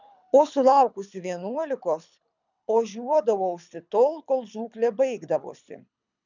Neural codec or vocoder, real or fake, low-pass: codec, 24 kHz, 6 kbps, HILCodec; fake; 7.2 kHz